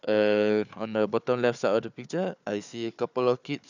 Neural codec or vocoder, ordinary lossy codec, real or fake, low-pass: codec, 16 kHz, 4 kbps, FunCodec, trained on Chinese and English, 50 frames a second; none; fake; 7.2 kHz